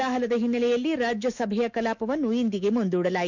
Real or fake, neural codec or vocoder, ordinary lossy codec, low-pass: fake; autoencoder, 48 kHz, 128 numbers a frame, DAC-VAE, trained on Japanese speech; none; 7.2 kHz